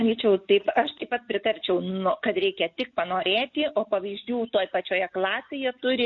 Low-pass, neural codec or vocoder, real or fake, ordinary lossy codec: 7.2 kHz; none; real; MP3, 48 kbps